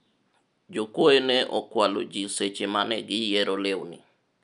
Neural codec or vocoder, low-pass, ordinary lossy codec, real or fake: none; 10.8 kHz; none; real